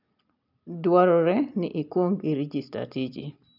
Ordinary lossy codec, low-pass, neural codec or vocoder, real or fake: none; 5.4 kHz; none; real